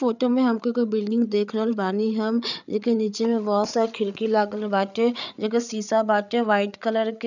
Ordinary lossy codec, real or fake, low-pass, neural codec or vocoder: none; fake; 7.2 kHz; codec, 16 kHz, 8 kbps, FreqCodec, larger model